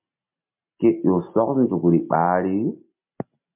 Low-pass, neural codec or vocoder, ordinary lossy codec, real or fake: 3.6 kHz; none; MP3, 32 kbps; real